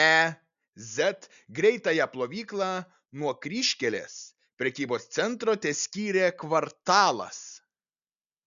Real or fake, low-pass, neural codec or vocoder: real; 7.2 kHz; none